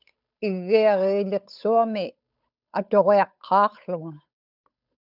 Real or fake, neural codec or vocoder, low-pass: fake; codec, 16 kHz, 8 kbps, FunCodec, trained on Chinese and English, 25 frames a second; 5.4 kHz